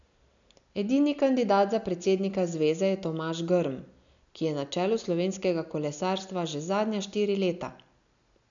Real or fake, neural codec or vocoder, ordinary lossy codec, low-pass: real; none; none; 7.2 kHz